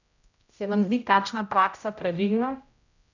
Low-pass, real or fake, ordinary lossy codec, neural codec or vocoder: 7.2 kHz; fake; none; codec, 16 kHz, 0.5 kbps, X-Codec, HuBERT features, trained on general audio